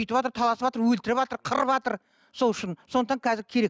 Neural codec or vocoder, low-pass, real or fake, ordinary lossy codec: none; none; real; none